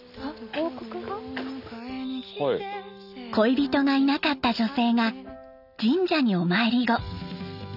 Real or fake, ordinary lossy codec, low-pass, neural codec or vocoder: real; none; 5.4 kHz; none